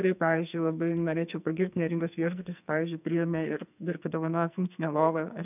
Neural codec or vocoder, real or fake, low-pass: codec, 44.1 kHz, 2.6 kbps, SNAC; fake; 3.6 kHz